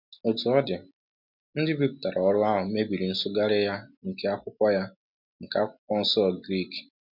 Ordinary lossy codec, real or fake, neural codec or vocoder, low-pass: none; real; none; 5.4 kHz